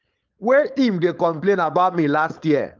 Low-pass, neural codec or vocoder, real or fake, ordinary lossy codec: 7.2 kHz; codec, 16 kHz, 4.8 kbps, FACodec; fake; Opus, 24 kbps